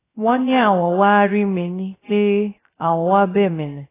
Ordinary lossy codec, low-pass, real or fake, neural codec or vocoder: AAC, 16 kbps; 3.6 kHz; fake; codec, 16 kHz, 0.3 kbps, FocalCodec